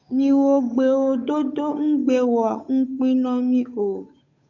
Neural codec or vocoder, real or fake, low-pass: codec, 16 kHz, 8 kbps, FunCodec, trained on Chinese and English, 25 frames a second; fake; 7.2 kHz